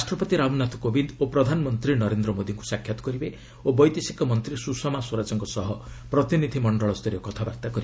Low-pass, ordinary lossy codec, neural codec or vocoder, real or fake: none; none; none; real